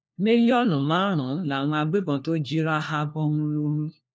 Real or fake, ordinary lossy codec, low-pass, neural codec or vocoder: fake; none; none; codec, 16 kHz, 1 kbps, FunCodec, trained on LibriTTS, 50 frames a second